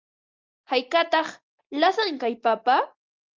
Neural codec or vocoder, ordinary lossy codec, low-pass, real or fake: none; Opus, 24 kbps; 7.2 kHz; real